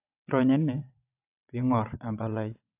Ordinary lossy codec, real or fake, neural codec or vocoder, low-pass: AAC, 32 kbps; fake; vocoder, 22.05 kHz, 80 mel bands, WaveNeXt; 3.6 kHz